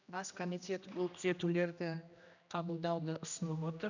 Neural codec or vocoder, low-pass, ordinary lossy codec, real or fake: codec, 16 kHz, 1 kbps, X-Codec, HuBERT features, trained on general audio; 7.2 kHz; none; fake